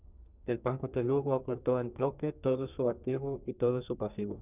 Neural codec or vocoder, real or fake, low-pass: codec, 32 kHz, 1.9 kbps, SNAC; fake; 3.6 kHz